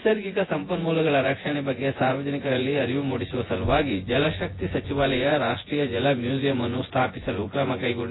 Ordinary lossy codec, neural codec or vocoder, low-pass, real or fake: AAC, 16 kbps; vocoder, 24 kHz, 100 mel bands, Vocos; 7.2 kHz; fake